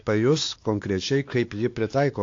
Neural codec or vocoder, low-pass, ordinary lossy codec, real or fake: codec, 16 kHz, 2 kbps, X-Codec, WavLM features, trained on Multilingual LibriSpeech; 7.2 kHz; AAC, 48 kbps; fake